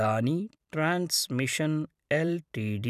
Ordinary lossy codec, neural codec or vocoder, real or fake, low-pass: none; vocoder, 44.1 kHz, 128 mel bands every 256 samples, BigVGAN v2; fake; 14.4 kHz